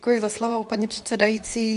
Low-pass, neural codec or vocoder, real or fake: 10.8 kHz; codec, 24 kHz, 0.9 kbps, WavTokenizer, medium speech release version 2; fake